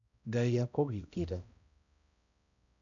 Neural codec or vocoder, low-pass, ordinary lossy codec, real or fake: codec, 16 kHz, 0.5 kbps, X-Codec, HuBERT features, trained on balanced general audio; 7.2 kHz; none; fake